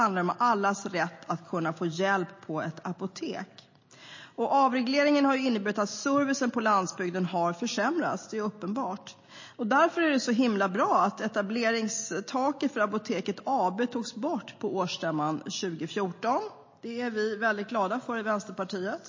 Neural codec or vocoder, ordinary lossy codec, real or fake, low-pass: none; MP3, 32 kbps; real; 7.2 kHz